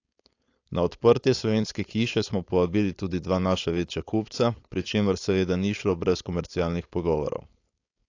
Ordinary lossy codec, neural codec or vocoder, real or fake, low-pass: AAC, 48 kbps; codec, 16 kHz, 4.8 kbps, FACodec; fake; 7.2 kHz